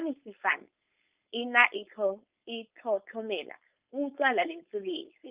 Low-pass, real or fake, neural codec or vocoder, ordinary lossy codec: 3.6 kHz; fake; codec, 16 kHz, 4.8 kbps, FACodec; Opus, 24 kbps